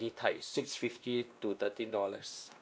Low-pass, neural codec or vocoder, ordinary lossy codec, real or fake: none; codec, 16 kHz, 1 kbps, X-Codec, WavLM features, trained on Multilingual LibriSpeech; none; fake